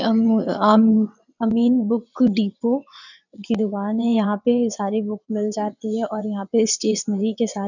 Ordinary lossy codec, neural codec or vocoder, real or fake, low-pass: none; vocoder, 22.05 kHz, 80 mel bands, WaveNeXt; fake; 7.2 kHz